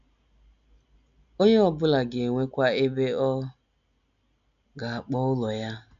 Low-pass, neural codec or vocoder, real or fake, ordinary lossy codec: 7.2 kHz; none; real; none